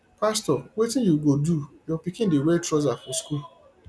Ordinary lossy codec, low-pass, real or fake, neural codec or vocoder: none; none; real; none